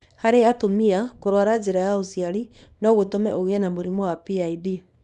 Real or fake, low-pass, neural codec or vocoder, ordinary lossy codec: fake; 10.8 kHz; codec, 24 kHz, 0.9 kbps, WavTokenizer, small release; none